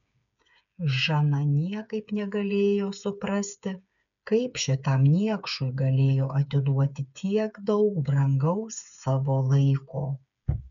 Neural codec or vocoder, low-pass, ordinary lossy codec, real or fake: codec, 16 kHz, 8 kbps, FreqCodec, smaller model; 7.2 kHz; MP3, 96 kbps; fake